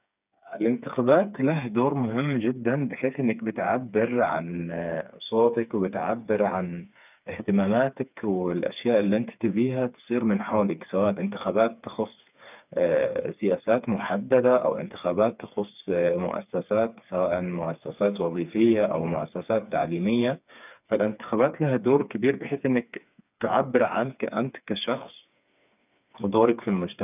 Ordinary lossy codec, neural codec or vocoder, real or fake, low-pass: none; codec, 16 kHz, 4 kbps, FreqCodec, smaller model; fake; 3.6 kHz